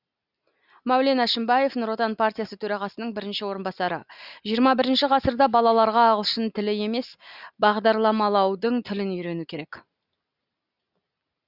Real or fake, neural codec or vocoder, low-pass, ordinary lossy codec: real; none; 5.4 kHz; Opus, 64 kbps